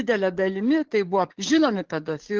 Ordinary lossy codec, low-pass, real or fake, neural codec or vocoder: Opus, 16 kbps; 7.2 kHz; fake; codec, 44.1 kHz, 7.8 kbps, DAC